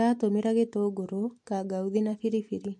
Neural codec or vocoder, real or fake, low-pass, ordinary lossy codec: none; real; 10.8 kHz; MP3, 48 kbps